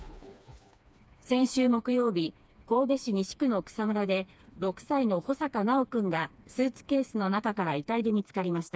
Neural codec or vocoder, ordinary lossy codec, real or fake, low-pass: codec, 16 kHz, 2 kbps, FreqCodec, smaller model; none; fake; none